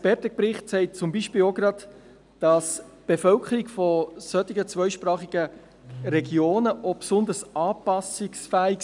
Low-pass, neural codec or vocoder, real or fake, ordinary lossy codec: 10.8 kHz; none; real; none